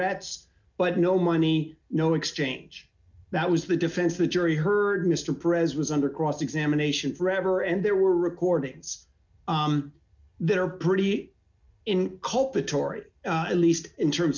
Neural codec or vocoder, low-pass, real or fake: none; 7.2 kHz; real